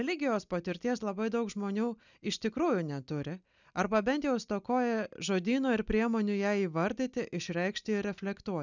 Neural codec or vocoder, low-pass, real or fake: none; 7.2 kHz; real